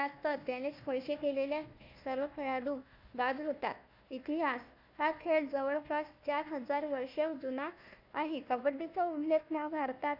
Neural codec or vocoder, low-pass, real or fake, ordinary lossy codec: codec, 16 kHz, 1 kbps, FunCodec, trained on Chinese and English, 50 frames a second; 5.4 kHz; fake; AAC, 48 kbps